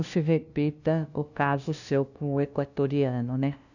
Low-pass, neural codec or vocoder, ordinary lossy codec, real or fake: 7.2 kHz; codec, 16 kHz, 0.5 kbps, FunCodec, trained on LibriTTS, 25 frames a second; none; fake